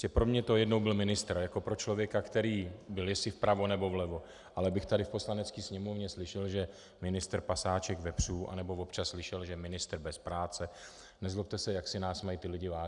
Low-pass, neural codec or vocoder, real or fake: 10.8 kHz; none; real